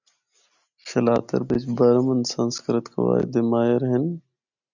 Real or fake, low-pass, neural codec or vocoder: real; 7.2 kHz; none